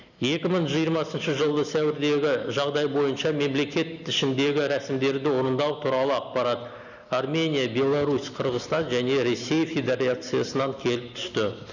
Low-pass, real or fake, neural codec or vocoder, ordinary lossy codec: 7.2 kHz; real; none; none